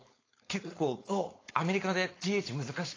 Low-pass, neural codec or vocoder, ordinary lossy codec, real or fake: 7.2 kHz; codec, 16 kHz, 4.8 kbps, FACodec; AAC, 32 kbps; fake